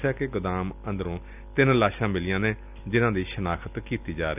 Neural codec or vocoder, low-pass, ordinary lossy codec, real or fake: none; 3.6 kHz; none; real